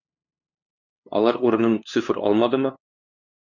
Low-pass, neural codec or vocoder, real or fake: 7.2 kHz; codec, 16 kHz, 2 kbps, FunCodec, trained on LibriTTS, 25 frames a second; fake